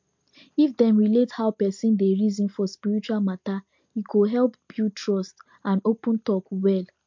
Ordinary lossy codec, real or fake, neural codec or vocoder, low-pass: MP3, 48 kbps; real; none; 7.2 kHz